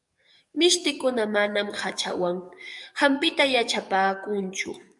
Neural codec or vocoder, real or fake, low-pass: codec, 44.1 kHz, 7.8 kbps, DAC; fake; 10.8 kHz